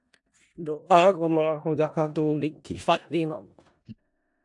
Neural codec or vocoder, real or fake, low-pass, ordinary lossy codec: codec, 16 kHz in and 24 kHz out, 0.4 kbps, LongCat-Audio-Codec, four codebook decoder; fake; 10.8 kHz; AAC, 64 kbps